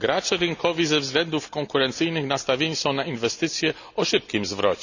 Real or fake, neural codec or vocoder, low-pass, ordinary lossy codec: real; none; 7.2 kHz; none